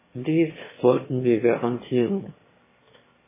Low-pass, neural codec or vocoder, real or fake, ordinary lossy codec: 3.6 kHz; autoencoder, 22.05 kHz, a latent of 192 numbers a frame, VITS, trained on one speaker; fake; MP3, 16 kbps